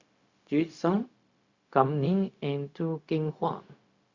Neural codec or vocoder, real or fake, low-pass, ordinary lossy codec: codec, 16 kHz, 0.4 kbps, LongCat-Audio-Codec; fake; 7.2 kHz; Opus, 64 kbps